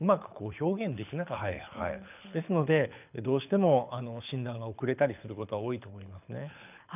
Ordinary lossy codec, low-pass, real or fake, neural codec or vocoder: none; 3.6 kHz; fake; codec, 24 kHz, 6 kbps, HILCodec